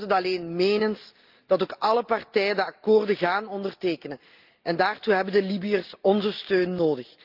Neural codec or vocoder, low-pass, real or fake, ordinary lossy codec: none; 5.4 kHz; real; Opus, 32 kbps